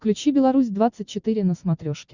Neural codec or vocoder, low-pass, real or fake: none; 7.2 kHz; real